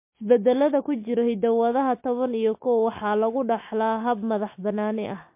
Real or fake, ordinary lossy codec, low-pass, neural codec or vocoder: real; MP3, 24 kbps; 3.6 kHz; none